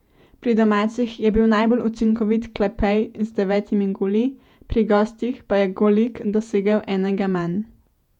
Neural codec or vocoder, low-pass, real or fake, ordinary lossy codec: vocoder, 48 kHz, 128 mel bands, Vocos; 19.8 kHz; fake; none